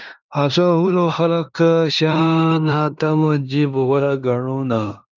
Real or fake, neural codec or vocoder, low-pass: fake; codec, 16 kHz in and 24 kHz out, 0.9 kbps, LongCat-Audio-Codec, fine tuned four codebook decoder; 7.2 kHz